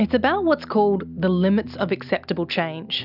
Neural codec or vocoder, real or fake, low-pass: none; real; 5.4 kHz